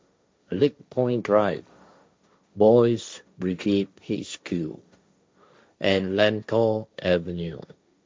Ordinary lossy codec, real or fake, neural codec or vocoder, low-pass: none; fake; codec, 16 kHz, 1.1 kbps, Voila-Tokenizer; none